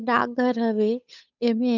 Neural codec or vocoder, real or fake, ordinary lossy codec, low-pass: codec, 16 kHz, 8 kbps, FunCodec, trained on Chinese and English, 25 frames a second; fake; none; 7.2 kHz